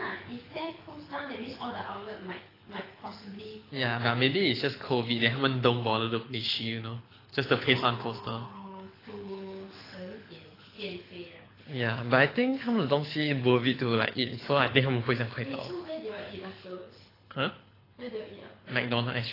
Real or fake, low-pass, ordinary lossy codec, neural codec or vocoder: fake; 5.4 kHz; AAC, 24 kbps; codec, 24 kHz, 6 kbps, HILCodec